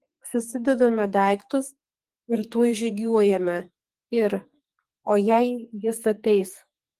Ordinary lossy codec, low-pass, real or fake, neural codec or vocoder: Opus, 24 kbps; 14.4 kHz; fake; codec, 44.1 kHz, 2.6 kbps, SNAC